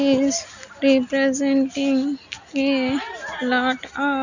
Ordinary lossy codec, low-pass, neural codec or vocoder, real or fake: none; 7.2 kHz; none; real